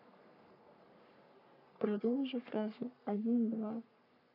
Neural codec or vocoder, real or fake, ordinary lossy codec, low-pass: codec, 44.1 kHz, 3.4 kbps, Pupu-Codec; fake; none; 5.4 kHz